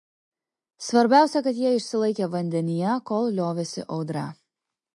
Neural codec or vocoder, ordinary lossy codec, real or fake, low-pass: none; MP3, 48 kbps; real; 10.8 kHz